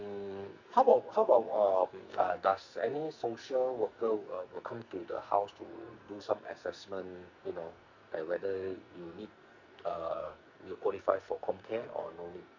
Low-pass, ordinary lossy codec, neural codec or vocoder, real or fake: 7.2 kHz; Opus, 64 kbps; codec, 44.1 kHz, 2.6 kbps, SNAC; fake